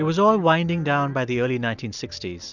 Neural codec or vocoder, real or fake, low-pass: none; real; 7.2 kHz